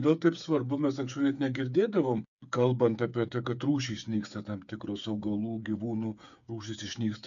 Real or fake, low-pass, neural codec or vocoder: fake; 7.2 kHz; codec, 16 kHz, 8 kbps, FreqCodec, smaller model